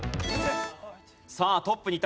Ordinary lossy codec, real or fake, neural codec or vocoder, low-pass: none; real; none; none